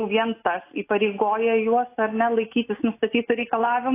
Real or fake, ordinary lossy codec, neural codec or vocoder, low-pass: real; AAC, 24 kbps; none; 3.6 kHz